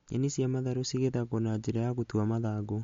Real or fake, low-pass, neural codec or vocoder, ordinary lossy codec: real; 7.2 kHz; none; MP3, 48 kbps